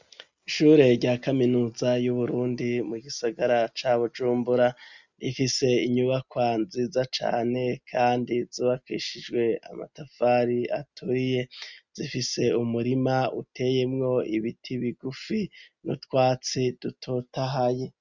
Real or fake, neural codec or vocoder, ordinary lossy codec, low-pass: real; none; Opus, 64 kbps; 7.2 kHz